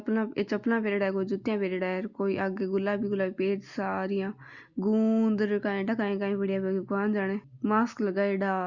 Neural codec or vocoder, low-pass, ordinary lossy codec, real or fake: none; 7.2 kHz; Opus, 64 kbps; real